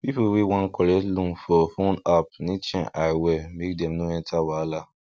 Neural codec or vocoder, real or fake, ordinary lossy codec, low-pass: none; real; none; none